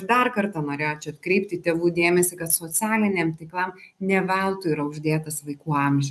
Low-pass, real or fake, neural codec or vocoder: 14.4 kHz; real; none